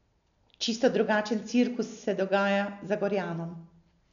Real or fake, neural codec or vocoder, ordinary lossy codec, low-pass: real; none; none; 7.2 kHz